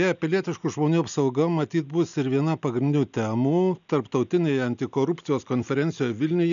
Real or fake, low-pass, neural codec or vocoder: real; 7.2 kHz; none